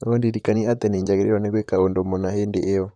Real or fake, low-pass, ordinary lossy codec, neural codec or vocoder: fake; 9.9 kHz; none; vocoder, 44.1 kHz, 128 mel bands, Pupu-Vocoder